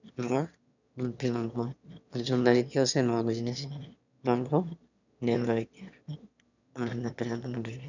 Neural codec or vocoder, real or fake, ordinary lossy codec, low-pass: autoencoder, 22.05 kHz, a latent of 192 numbers a frame, VITS, trained on one speaker; fake; none; 7.2 kHz